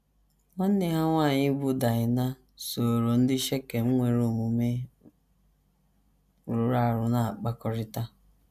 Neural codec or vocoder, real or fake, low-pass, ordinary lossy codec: none; real; 14.4 kHz; none